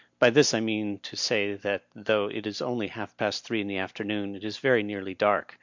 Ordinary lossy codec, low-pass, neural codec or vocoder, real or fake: MP3, 64 kbps; 7.2 kHz; none; real